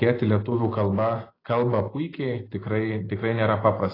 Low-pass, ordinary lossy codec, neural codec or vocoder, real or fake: 5.4 kHz; AAC, 24 kbps; none; real